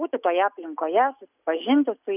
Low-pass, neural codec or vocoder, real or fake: 3.6 kHz; none; real